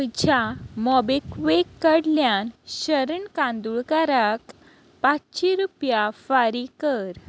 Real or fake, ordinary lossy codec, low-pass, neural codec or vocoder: real; none; none; none